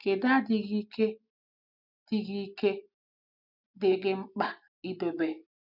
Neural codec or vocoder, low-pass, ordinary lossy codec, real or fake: none; 5.4 kHz; none; real